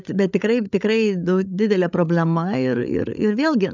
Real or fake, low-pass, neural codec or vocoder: fake; 7.2 kHz; codec, 16 kHz, 8 kbps, FreqCodec, larger model